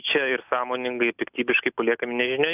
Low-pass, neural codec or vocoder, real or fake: 3.6 kHz; none; real